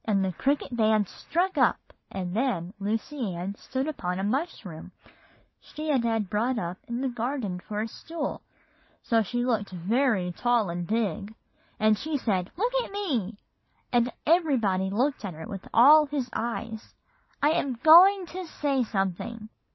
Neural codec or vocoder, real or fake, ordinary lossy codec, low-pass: none; real; MP3, 24 kbps; 7.2 kHz